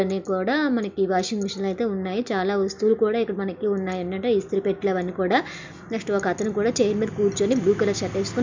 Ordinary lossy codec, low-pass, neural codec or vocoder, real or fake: MP3, 64 kbps; 7.2 kHz; none; real